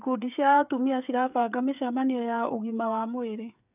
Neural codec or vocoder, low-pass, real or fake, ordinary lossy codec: codec, 16 kHz, 8 kbps, FreqCodec, smaller model; 3.6 kHz; fake; none